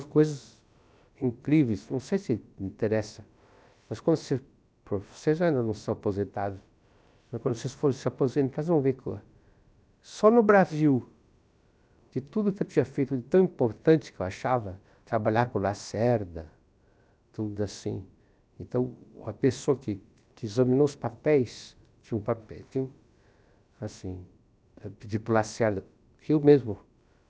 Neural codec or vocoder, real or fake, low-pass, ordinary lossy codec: codec, 16 kHz, about 1 kbps, DyCAST, with the encoder's durations; fake; none; none